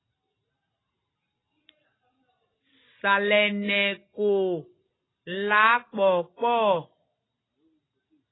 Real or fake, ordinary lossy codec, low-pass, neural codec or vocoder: real; AAC, 16 kbps; 7.2 kHz; none